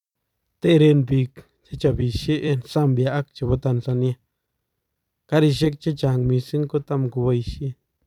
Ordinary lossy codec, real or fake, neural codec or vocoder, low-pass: none; fake; vocoder, 44.1 kHz, 128 mel bands every 256 samples, BigVGAN v2; 19.8 kHz